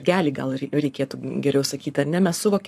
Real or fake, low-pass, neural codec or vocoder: fake; 14.4 kHz; codec, 44.1 kHz, 7.8 kbps, Pupu-Codec